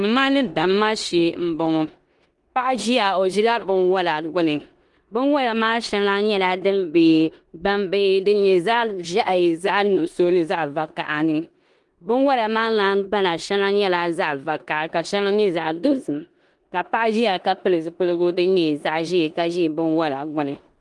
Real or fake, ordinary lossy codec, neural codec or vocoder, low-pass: fake; Opus, 24 kbps; codec, 16 kHz in and 24 kHz out, 0.9 kbps, LongCat-Audio-Codec, four codebook decoder; 10.8 kHz